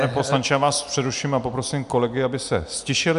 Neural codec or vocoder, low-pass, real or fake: none; 10.8 kHz; real